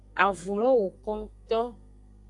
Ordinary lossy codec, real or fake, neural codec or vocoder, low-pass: AAC, 64 kbps; fake; codec, 32 kHz, 1.9 kbps, SNAC; 10.8 kHz